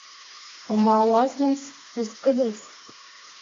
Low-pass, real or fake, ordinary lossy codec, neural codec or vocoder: 7.2 kHz; fake; AAC, 32 kbps; codec, 16 kHz, 2 kbps, FreqCodec, smaller model